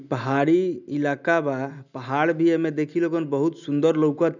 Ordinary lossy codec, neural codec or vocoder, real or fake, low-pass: none; none; real; 7.2 kHz